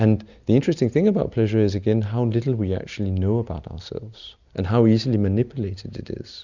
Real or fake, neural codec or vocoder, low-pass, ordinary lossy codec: real; none; 7.2 kHz; Opus, 64 kbps